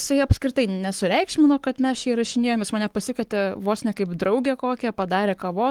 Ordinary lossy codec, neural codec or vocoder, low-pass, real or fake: Opus, 24 kbps; codec, 44.1 kHz, 7.8 kbps, Pupu-Codec; 19.8 kHz; fake